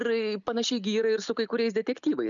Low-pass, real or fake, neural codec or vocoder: 7.2 kHz; real; none